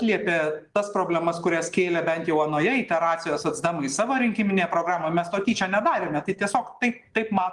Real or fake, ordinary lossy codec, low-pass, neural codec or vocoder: real; Opus, 24 kbps; 10.8 kHz; none